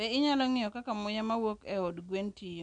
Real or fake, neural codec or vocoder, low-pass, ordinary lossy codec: real; none; 9.9 kHz; none